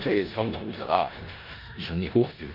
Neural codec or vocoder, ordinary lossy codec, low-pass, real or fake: codec, 16 kHz in and 24 kHz out, 0.9 kbps, LongCat-Audio-Codec, four codebook decoder; none; 5.4 kHz; fake